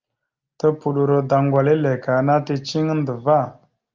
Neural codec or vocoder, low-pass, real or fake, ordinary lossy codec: none; 7.2 kHz; real; Opus, 32 kbps